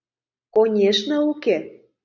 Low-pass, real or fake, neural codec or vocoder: 7.2 kHz; real; none